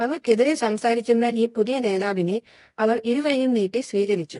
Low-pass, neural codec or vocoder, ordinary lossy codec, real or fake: 10.8 kHz; codec, 24 kHz, 0.9 kbps, WavTokenizer, medium music audio release; AAC, 48 kbps; fake